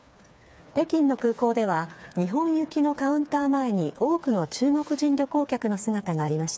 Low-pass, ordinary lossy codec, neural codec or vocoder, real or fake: none; none; codec, 16 kHz, 4 kbps, FreqCodec, smaller model; fake